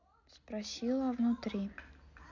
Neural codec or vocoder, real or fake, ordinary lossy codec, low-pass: none; real; MP3, 64 kbps; 7.2 kHz